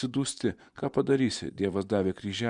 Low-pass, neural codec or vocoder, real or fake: 10.8 kHz; vocoder, 24 kHz, 100 mel bands, Vocos; fake